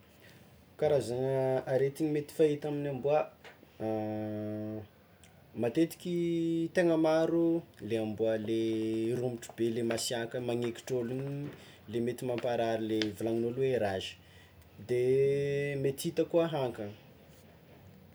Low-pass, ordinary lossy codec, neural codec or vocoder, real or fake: none; none; none; real